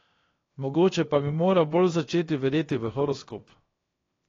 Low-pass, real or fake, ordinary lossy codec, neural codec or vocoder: 7.2 kHz; fake; AAC, 32 kbps; codec, 16 kHz, 0.7 kbps, FocalCodec